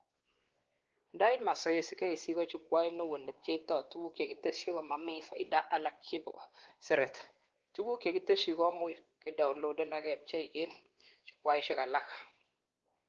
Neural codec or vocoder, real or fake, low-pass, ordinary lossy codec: codec, 16 kHz, 2 kbps, X-Codec, WavLM features, trained on Multilingual LibriSpeech; fake; 7.2 kHz; Opus, 16 kbps